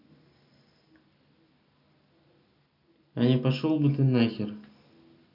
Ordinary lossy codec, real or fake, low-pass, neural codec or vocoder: none; real; 5.4 kHz; none